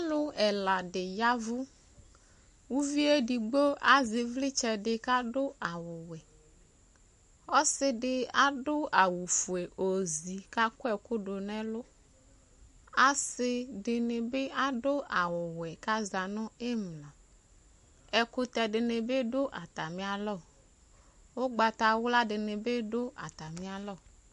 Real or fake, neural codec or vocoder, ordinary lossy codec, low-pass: real; none; MP3, 48 kbps; 14.4 kHz